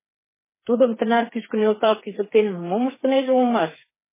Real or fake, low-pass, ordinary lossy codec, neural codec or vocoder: fake; 3.6 kHz; MP3, 16 kbps; codec, 16 kHz, 4 kbps, FreqCodec, smaller model